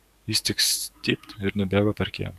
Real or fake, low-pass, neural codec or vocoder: fake; 14.4 kHz; codec, 44.1 kHz, 7.8 kbps, DAC